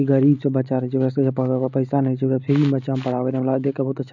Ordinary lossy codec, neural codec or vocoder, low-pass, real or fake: none; none; 7.2 kHz; real